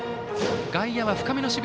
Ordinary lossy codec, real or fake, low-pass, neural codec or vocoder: none; real; none; none